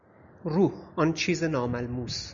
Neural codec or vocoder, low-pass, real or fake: none; 7.2 kHz; real